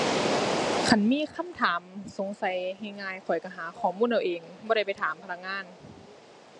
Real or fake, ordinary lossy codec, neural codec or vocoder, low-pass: real; MP3, 48 kbps; none; 10.8 kHz